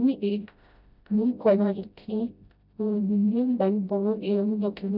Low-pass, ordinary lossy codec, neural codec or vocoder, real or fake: 5.4 kHz; none; codec, 16 kHz, 0.5 kbps, FreqCodec, smaller model; fake